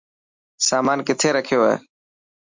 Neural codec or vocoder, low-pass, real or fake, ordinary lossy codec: none; 7.2 kHz; real; MP3, 64 kbps